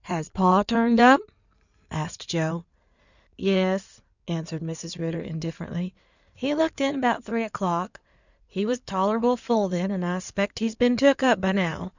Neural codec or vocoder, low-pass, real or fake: codec, 16 kHz in and 24 kHz out, 2.2 kbps, FireRedTTS-2 codec; 7.2 kHz; fake